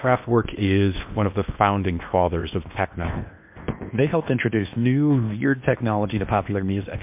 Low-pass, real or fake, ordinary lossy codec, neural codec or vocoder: 3.6 kHz; fake; MP3, 32 kbps; codec, 16 kHz in and 24 kHz out, 0.8 kbps, FocalCodec, streaming, 65536 codes